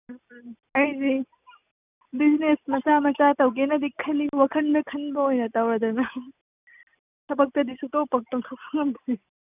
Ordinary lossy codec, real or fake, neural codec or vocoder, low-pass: none; real; none; 3.6 kHz